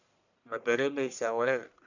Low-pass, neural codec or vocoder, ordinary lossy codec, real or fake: 7.2 kHz; codec, 44.1 kHz, 1.7 kbps, Pupu-Codec; none; fake